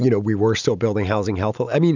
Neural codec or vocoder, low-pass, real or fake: none; 7.2 kHz; real